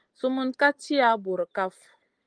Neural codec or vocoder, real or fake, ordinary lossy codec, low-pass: none; real; Opus, 24 kbps; 9.9 kHz